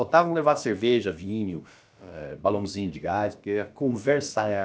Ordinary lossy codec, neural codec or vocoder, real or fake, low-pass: none; codec, 16 kHz, about 1 kbps, DyCAST, with the encoder's durations; fake; none